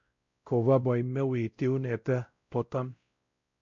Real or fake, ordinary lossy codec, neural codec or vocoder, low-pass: fake; MP3, 48 kbps; codec, 16 kHz, 0.5 kbps, X-Codec, WavLM features, trained on Multilingual LibriSpeech; 7.2 kHz